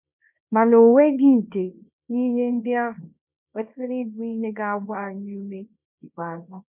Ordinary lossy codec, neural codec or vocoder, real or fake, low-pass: none; codec, 24 kHz, 0.9 kbps, WavTokenizer, small release; fake; 3.6 kHz